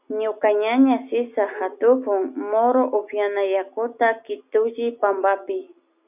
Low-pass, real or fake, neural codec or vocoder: 3.6 kHz; real; none